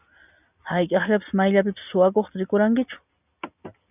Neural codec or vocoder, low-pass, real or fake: none; 3.6 kHz; real